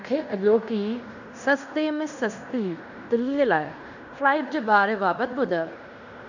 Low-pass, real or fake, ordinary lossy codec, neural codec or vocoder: 7.2 kHz; fake; none; codec, 16 kHz in and 24 kHz out, 0.9 kbps, LongCat-Audio-Codec, fine tuned four codebook decoder